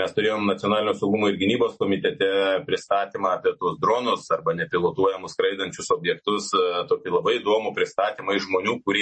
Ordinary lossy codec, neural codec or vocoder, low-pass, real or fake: MP3, 32 kbps; none; 10.8 kHz; real